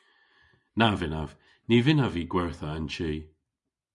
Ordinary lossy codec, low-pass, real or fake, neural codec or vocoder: MP3, 96 kbps; 10.8 kHz; real; none